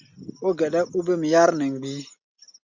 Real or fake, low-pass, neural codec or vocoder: real; 7.2 kHz; none